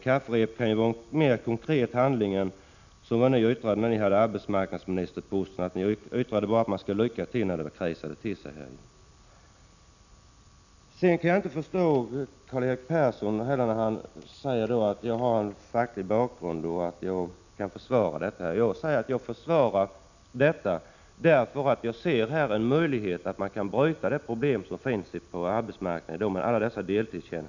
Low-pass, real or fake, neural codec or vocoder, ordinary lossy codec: 7.2 kHz; real; none; none